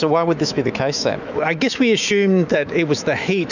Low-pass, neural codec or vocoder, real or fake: 7.2 kHz; autoencoder, 48 kHz, 128 numbers a frame, DAC-VAE, trained on Japanese speech; fake